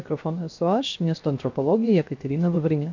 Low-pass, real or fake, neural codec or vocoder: 7.2 kHz; fake; codec, 16 kHz, 0.7 kbps, FocalCodec